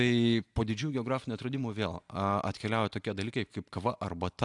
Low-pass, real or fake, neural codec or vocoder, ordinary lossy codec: 10.8 kHz; fake; vocoder, 44.1 kHz, 128 mel bands every 256 samples, BigVGAN v2; AAC, 64 kbps